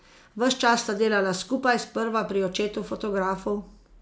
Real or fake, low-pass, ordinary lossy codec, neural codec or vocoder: real; none; none; none